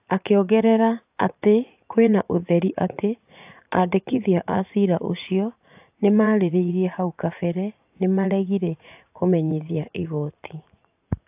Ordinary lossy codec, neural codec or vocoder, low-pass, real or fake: AAC, 32 kbps; vocoder, 44.1 kHz, 80 mel bands, Vocos; 3.6 kHz; fake